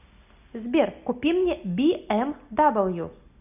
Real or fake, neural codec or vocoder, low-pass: real; none; 3.6 kHz